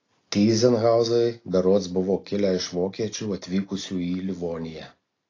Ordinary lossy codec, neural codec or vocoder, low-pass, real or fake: AAC, 32 kbps; none; 7.2 kHz; real